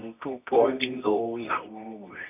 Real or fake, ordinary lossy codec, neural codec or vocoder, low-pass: fake; none; codec, 24 kHz, 0.9 kbps, WavTokenizer, medium music audio release; 3.6 kHz